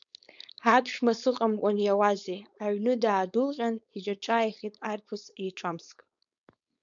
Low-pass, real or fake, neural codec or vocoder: 7.2 kHz; fake; codec, 16 kHz, 4.8 kbps, FACodec